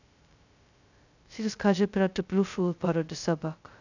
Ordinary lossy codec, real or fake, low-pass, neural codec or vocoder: none; fake; 7.2 kHz; codec, 16 kHz, 0.2 kbps, FocalCodec